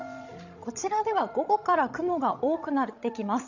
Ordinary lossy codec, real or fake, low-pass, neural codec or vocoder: none; fake; 7.2 kHz; codec, 16 kHz, 16 kbps, FreqCodec, larger model